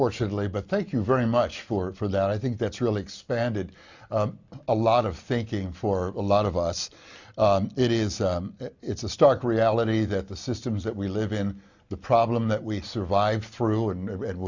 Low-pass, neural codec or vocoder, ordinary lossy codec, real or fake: 7.2 kHz; none; Opus, 64 kbps; real